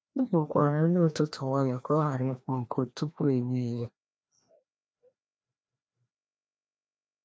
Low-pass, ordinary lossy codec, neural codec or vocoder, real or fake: none; none; codec, 16 kHz, 1 kbps, FreqCodec, larger model; fake